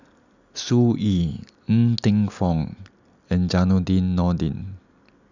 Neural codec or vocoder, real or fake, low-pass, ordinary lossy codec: none; real; 7.2 kHz; none